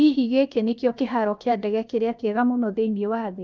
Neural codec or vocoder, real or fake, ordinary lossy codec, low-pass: codec, 16 kHz, 0.7 kbps, FocalCodec; fake; none; none